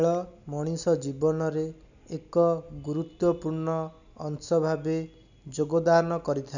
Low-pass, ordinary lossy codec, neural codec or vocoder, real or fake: 7.2 kHz; none; none; real